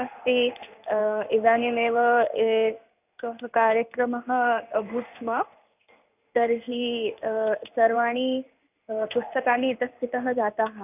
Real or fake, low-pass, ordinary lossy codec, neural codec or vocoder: fake; 3.6 kHz; none; codec, 16 kHz in and 24 kHz out, 1 kbps, XY-Tokenizer